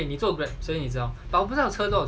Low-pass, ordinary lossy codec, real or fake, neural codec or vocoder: none; none; real; none